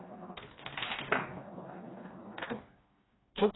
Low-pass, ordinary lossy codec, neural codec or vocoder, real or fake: 7.2 kHz; AAC, 16 kbps; codec, 16 kHz, 2 kbps, FreqCodec, smaller model; fake